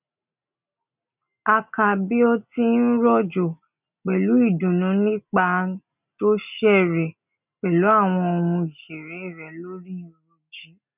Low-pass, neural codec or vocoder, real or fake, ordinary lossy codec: 3.6 kHz; none; real; none